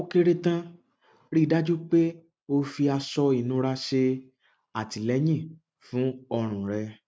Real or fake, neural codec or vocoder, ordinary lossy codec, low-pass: real; none; none; none